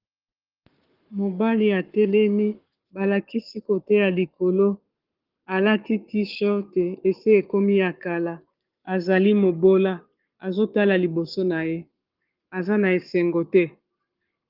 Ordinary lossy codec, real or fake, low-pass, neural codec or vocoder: Opus, 32 kbps; fake; 5.4 kHz; codec, 44.1 kHz, 7.8 kbps, Pupu-Codec